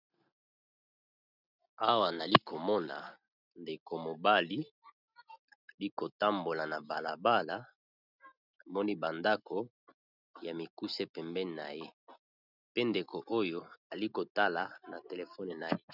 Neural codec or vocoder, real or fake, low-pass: none; real; 5.4 kHz